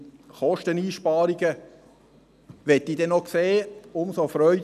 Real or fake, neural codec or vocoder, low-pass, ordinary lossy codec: real; none; 14.4 kHz; none